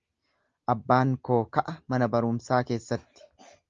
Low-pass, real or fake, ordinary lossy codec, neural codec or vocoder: 7.2 kHz; real; Opus, 32 kbps; none